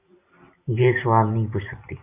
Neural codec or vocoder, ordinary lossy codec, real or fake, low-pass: vocoder, 44.1 kHz, 128 mel bands every 256 samples, BigVGAN v2; AAC, 24 kbps; fake; 3.6 kHz